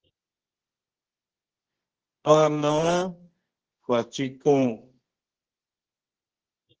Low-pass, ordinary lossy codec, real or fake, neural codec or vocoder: 7.2 kHz; Opus, 16 kbps; fake; codec, 24 kHz, 0.9 kbps, WavTokenizer, medium music audio release